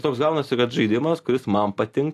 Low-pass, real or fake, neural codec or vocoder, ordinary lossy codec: 14.4 kHz; real; none; AAC, 64 kbps